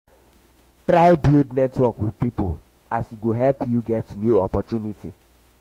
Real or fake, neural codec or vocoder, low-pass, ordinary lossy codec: fake; autoencoder, 48 kHz, 32 numbers a frame, DAC-VAE, trained on Japanese speech; 19.8 kHz; AAC, 48 kbps